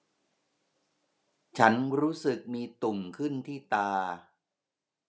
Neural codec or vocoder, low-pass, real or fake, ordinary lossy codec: none; none; real; none